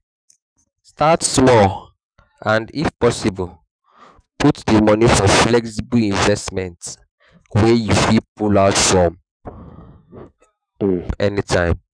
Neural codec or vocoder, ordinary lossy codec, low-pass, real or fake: autoencoder, 48 kHz, 128 numbers a frame, DAC-VAE, trained on Japanese speech; none; 9.9 kHz; fake